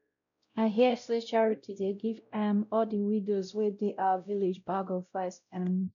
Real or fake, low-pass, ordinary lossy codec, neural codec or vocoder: fake; 7.2 kHz; none; codec, 16 kHz, 0.5 kbps, X-Codec, WavLM features, trained on Multilingual LibriSpeech